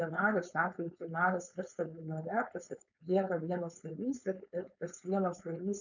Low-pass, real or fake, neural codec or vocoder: 7.2 kHz; fake; codec, 16 kHz, 4.8 kbps, FACodec